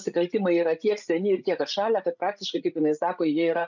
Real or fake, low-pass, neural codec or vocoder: fake; 7.2 kHz; codec, 16 kHz, 16 kbps, FreqCodec, larger model